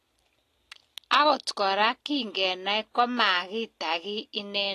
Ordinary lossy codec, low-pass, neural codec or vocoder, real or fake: AAC, 48 kbps; 14.4 kHz; none; real